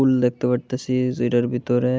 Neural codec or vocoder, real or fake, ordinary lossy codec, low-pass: none; real; none; none